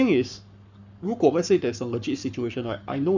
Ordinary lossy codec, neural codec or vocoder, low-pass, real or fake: none; codec, 44.1 kHz, 7.8 kbps, Pupu-Codec; 7.2 kHz; fake